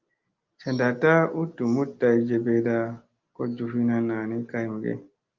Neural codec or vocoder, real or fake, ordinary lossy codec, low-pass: none; real; Opus, 24 kbps; 7.2 kHz